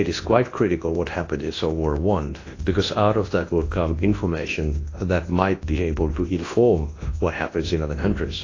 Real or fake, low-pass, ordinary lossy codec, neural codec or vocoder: fake; 7.2 kHz; AAC, 32 kbps; codec, 24 kHz, 0.9 kbps, WavTokenizer, large speech release